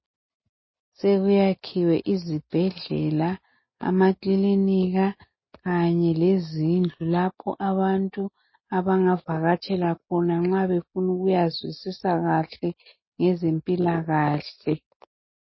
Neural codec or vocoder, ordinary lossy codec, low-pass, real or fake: none; MP3, 24 kbps; 7.2 kHz; real